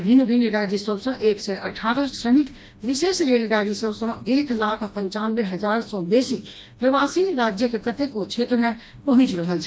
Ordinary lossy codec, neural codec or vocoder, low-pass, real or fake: none; codec, 16 kHz, 1 kbps, FreqCodec, smaller model; none; fake